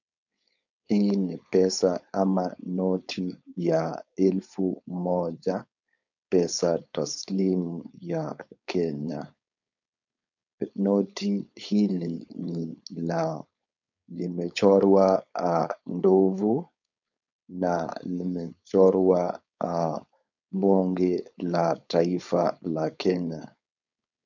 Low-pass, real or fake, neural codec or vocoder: 7.2 kHz; fake; codec, 16 kHz, 4.8 kbps, FACodec